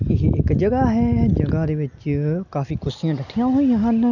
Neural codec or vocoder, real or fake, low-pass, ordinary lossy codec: none; real; 7.2 kHz; none